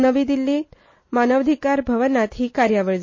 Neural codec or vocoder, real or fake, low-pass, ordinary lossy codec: none; real; 7.2 kHz; MP3, 32 kbps